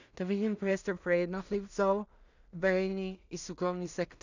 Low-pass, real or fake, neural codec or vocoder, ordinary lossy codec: 7.2 kHz; fake; codec, 16 kHz in and 24 kHz out, 0.4 kbps, LongCat-Audio-Codec, two codebook decoder; none